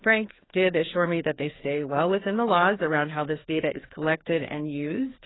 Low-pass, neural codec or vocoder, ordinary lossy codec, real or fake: 7.2 kHz; codec, 16 kHz, 2 kbps, FreqCodec, larger model; AAC, 16 kbps; fake